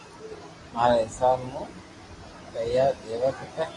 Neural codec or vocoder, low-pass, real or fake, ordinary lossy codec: none; 10.8 kHz; real; AAC, 32 kbps